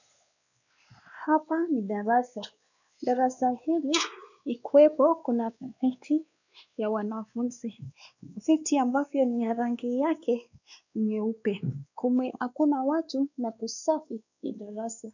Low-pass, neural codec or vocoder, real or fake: 7.2 kHz; codec, 16 kHz, 2 kbps, X-Codec, WavLM features, trained on Multilingual LibriSpeech; fake